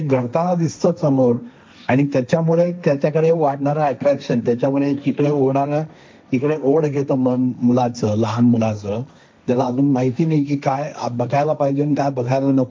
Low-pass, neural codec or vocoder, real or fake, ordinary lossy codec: none; codec, 16 kHz, 1.1 kbps, Voila-Tokenizer; fake; none